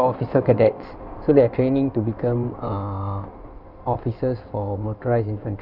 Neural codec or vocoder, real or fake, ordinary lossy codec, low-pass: codec, 16 kHz in and 24 kHz out, 2.2 kbps, FireRedTTS-2 codec; fake; none; 5.4 kHz